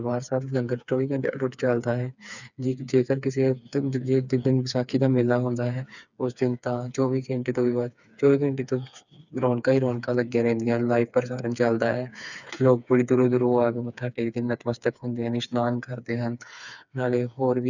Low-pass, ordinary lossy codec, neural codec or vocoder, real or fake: 7.2 kHz; none; codec, 16 kHz, 4 kbps, FreqCodec, smaller model; fake